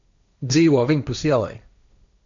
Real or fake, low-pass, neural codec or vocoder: fake; 7.2 kHz; codec, 16 kHz, 1.1 kbps, Voila-Tokenizer